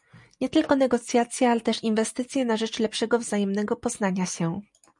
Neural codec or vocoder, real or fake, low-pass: none; real; 10.8 kHz